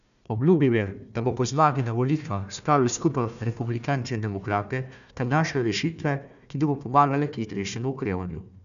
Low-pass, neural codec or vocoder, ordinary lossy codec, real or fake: 7.2 kHz; codec, 16 kHz, 1 kbps, FunCodec, trained on Chinese and English, 50 frames a second; none; fake